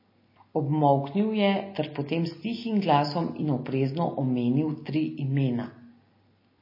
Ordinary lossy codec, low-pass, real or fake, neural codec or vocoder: MP3, 24 kbps; 5.4 kHz; real; none